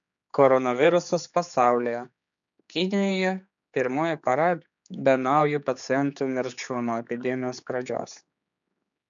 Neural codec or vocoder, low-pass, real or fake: codec, 16 kHz, 4 kbps, X-Codec, HuBERT features, trained on general audio; 7.2 kHz; fake